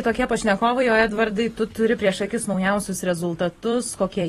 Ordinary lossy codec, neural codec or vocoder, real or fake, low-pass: AAC, 32 kbps; vocoder, 44.1 kHz, 128 mel bands every 256 samples, BigVGAN v2; fake; 19.8 kHz